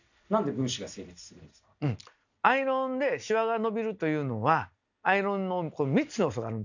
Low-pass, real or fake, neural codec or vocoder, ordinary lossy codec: 7.2 kHz; real; none; none